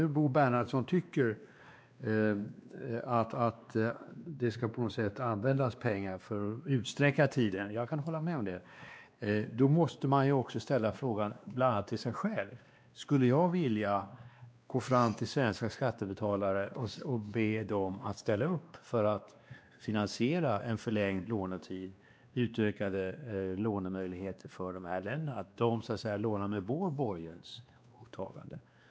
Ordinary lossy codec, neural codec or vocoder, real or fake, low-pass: none; codec, 16 kHz, 2 kbps, X-Codec, WavLM features, trained on Multilingual LibriSpeech; fake; none